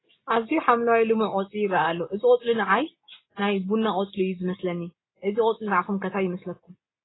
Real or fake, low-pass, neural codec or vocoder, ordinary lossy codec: real; 7.2 kHz; none; AAC, 16 kbps